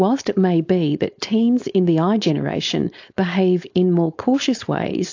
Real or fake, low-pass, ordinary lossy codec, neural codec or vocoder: fake; 7.2 kHz; AAC, 48 kbps; codec, 16 kHz, 4.8 kbps, FACodec